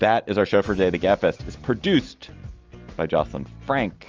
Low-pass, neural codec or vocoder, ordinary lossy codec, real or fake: 7.2 kHz; none; Opus, 24 kbps; real